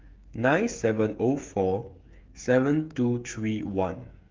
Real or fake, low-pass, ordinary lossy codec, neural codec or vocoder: fake; 7.2 kHz; Opus, 24 kbps; codec, 16 kHz, 8 kbps, FreqCodec, smaller model